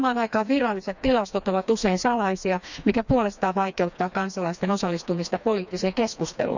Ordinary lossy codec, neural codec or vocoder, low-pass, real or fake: none; codec, 16 kHz, 2 kbps, FreqCodec, smaller model; 7.2 kHz; fake